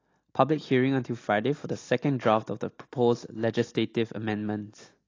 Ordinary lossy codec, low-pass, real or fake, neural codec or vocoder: AAC, 32 kbps; 7.2 kHz; real; none